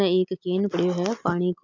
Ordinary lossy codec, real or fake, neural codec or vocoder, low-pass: MP3, 64 kbps; real; none; 7.2 kHz